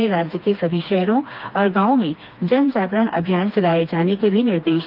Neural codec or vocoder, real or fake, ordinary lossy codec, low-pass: codec, 16 kHz, 2 kbps, FreqCodec, smaller model; fake; Opus, 24 kbps; 5.4 kHz